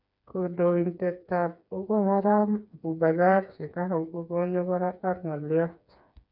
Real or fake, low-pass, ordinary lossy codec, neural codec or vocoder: fake; 5.4 kHz; none; codec, 16 kHz, 4 kbps, FreqCodec, smaller model